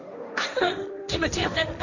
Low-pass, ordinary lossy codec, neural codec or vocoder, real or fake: 7.2 kHz; none; codec, 16 kHz, 1.1 kbps, Voila-Tokenizer; fake